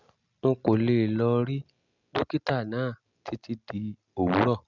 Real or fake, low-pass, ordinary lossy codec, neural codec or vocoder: real; 7.2 kHz; none; none